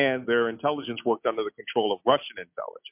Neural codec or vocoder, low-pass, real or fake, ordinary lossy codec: none; 3.6 kHz; real; MP3, 32 kbps